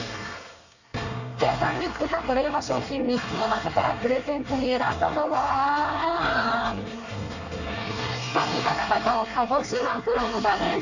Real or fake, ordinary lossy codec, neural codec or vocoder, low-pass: fake; none; codec, 24 kHz, 1 kbps, SNAC; 7.2 kHz